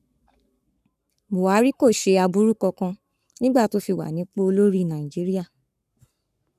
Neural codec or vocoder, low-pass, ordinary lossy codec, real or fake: codec, 44.1 kHz, 7.8 kbps, Pupu-Codec; 14.4 kHz; none; fake